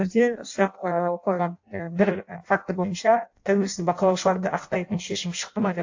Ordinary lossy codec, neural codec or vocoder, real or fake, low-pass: AAC, 48 kbps; codec, 16 kHz in and 24 kHz out, 0.6 kbps, FireRedTTS-2 codec; fake; 7.2 kHz